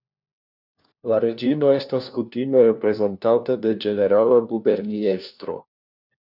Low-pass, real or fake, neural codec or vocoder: 5.4 kHz; fake; codec, 16 kHz, 1 kbps, FunCodec, trained on LibriTTS, 50 frames a second